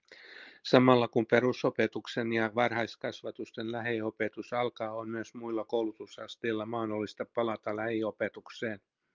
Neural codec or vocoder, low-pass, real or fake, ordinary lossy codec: none; 7.2 kHz; real; Opus, 32 kbps